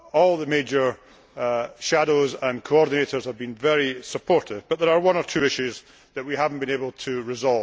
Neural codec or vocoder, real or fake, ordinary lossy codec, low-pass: none; real; none; none